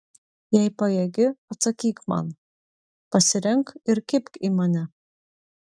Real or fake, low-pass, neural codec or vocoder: real; 9.9 kHz; none